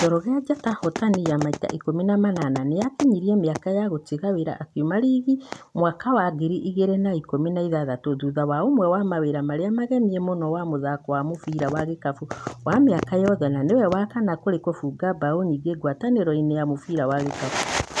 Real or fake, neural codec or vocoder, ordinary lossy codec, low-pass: real; none; none; none